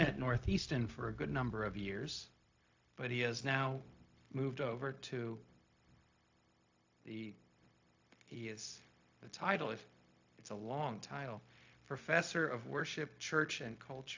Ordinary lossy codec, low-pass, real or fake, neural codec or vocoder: AAC, 48 kbps; 7.2 kHz; fake; codec, 16 kHz, 0.4 kbps, LongCat-Audio-Codec